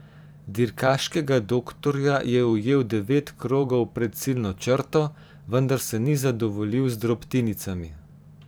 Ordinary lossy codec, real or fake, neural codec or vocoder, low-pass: none; fake; vocoder, 44.1 kHz, 128 mel bands every 512 samples, BigVGAN v2; none